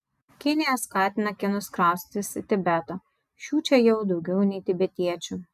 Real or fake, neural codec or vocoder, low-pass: real; none; 14.4 kHz